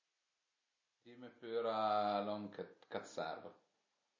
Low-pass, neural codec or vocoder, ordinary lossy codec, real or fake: 7.2 kHz; none; MP3, 32 kbps; real